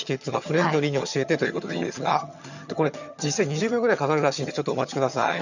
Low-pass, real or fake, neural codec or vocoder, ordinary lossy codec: 7.2 kHz; fake; vocoder, 22.05 kHz, 80 mel bands, HiFi-GAN; none